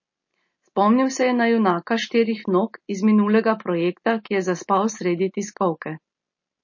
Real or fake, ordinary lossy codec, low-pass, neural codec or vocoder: real; MP3, 32 kbps; 7.2 kHz; none